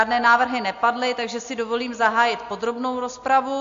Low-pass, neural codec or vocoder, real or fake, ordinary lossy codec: 7.2 kHz; none; real; AAC, 48 kbps